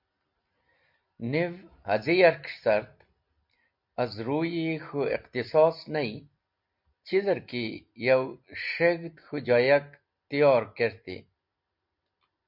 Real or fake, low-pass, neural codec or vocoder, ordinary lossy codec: real; 5.4 kHz; none; MP3, 48 kbps